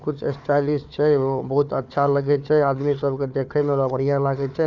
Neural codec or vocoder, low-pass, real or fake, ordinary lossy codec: codec, 16 kHz, 4 kbps, FunCodec, trained on Chinese and English, 50 frames a second; 7.2 kHz; fake; none